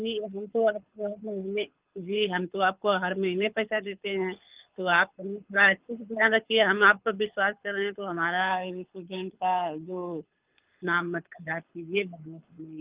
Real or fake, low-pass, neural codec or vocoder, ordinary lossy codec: fake; 3.6 kHz; codec, 24 kHz, 6 kbps, HILCodec; Opus, 32 kbps